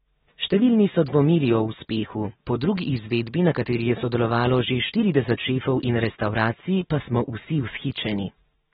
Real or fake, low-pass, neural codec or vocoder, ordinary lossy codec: real; 19.8 kHz; none; AAC, 16 kbps